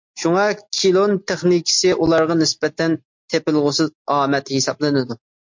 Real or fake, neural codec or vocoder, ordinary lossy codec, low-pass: real; none; MP3, 48 kbps; 7.2 kHz